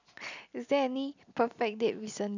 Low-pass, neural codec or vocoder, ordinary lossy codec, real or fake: 7.2 kHz; none; none; real